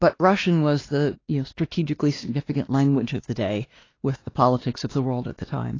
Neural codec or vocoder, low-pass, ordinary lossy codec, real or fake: codec, 16 kHz, 2 kbps, X-Codec, WavLM features, trained on Multilingual LibriSpeech; 7.2 kHz; AAC, 32 kbps; fake